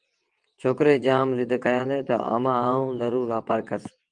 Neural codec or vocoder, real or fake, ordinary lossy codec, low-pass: vocoder, 22.05 kHz, 80 mel bands, WaveNeXt; fake; Opus, 24 kbps; 9.9 kHz